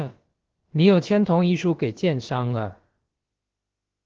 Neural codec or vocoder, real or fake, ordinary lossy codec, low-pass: codec, 16 kHz, about 1 kbps, DyCAST, with the encoder's durations; fake; Opus, 16 kbps; 7.2 kHz